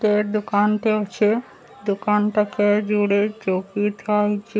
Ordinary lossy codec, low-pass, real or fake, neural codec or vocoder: none; none; real; none